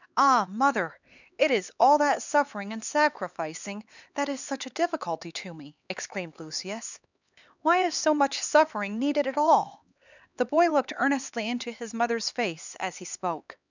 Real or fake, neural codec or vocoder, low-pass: fake; codec, 16 kHz, 4 kbps, X-Codec, HuBERT features, trained on LibriSpeech; 7.2 kHz